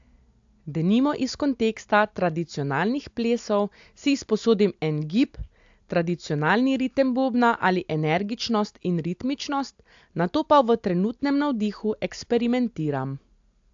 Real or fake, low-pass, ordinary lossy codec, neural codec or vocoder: real; 7.2 kHz; AAC, 64 kbps; none